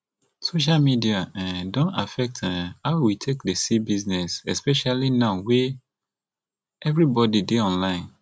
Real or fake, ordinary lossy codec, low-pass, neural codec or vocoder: real; none; none; none